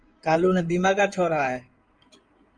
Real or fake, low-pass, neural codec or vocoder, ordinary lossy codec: fake; 9.9 kHz; codec, 16 kHz in and 24 kHz out, 2.2 kbps, FireRedTTS-2 codec; Opus, 32 kbps